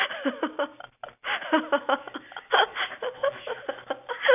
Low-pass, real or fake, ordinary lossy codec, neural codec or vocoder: 3.6 kHz; real; none; none